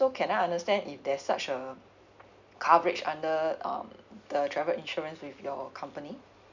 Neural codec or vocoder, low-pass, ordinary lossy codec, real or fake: none; 7.2 kHz; none; real